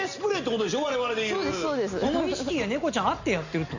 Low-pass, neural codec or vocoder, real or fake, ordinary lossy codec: 7.2 kHz; none; real; none